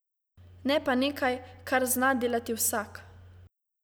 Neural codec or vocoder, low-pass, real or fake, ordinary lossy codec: none; none; real; none